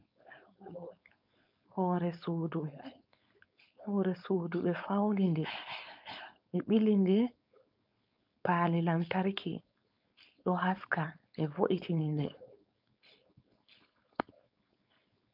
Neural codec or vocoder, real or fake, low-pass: codec, 16 kHz, 4.8 kbps, FACodec; fake; 5.4 kHz